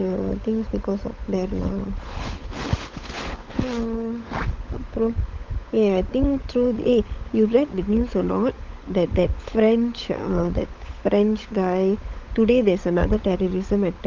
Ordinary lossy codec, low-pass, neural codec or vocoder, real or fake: Opus, 32 kbps; 7.2 kHz; codec, 16 kHz, 16 kbps, FunCodec, trained on LibriTTS, 50 frames a second; fake